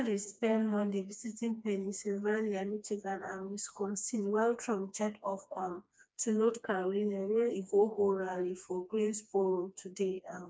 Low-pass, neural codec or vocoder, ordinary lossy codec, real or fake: none; codec, 16 kHz, 2 kbps, FreqCodec, smaller model; none; fake